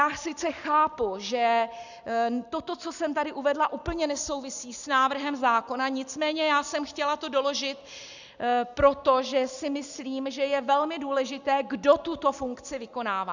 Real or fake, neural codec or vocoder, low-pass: real; none; 7.2 kHz